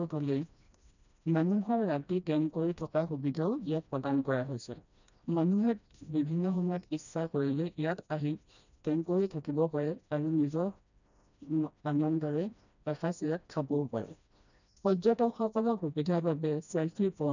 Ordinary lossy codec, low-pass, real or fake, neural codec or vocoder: none; 7.2 kHz; fake; codec, 16 kHz, 1 kbps, FreqCodec, smaller model